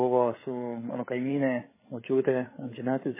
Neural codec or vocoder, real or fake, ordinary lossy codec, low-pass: codec, 16 kHz, 8 kbps, FreqCodec, larger model; fake; MP3, 16 kbps; 3.6 kHz